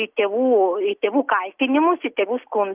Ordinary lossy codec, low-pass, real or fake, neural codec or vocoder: Opus, 32 kbps; 3.6 kHz; real; none